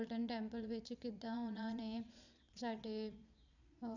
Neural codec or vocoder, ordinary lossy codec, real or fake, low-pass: vocoder, 22.05 kHz, 80 mel bands, Vocos; none; fake; 7.2 kHz